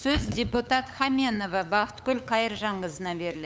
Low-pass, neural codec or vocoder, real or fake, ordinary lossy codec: none; codec, 16 kHz, 8 kbps, FunCodec, trained on LibriTTS, 25 frames a second; fake; none